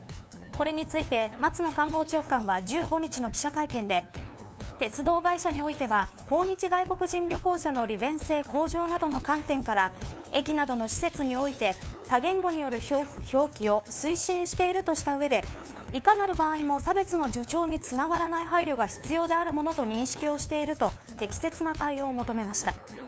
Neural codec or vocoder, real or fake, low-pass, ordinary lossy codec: codec, 16 kHz, 2 kbps, FunCodec, trained on LibriTTS, 25 frames a second; fake; none; none